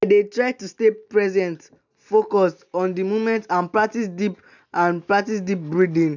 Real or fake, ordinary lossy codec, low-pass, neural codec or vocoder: real; none; 7.2 kHz; none